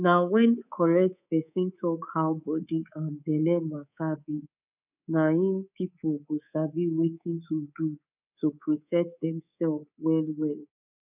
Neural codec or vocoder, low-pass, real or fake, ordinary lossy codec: codec, 24 kHz, 3.1 kbps, DualCodec; 3.6 kHz; fake; none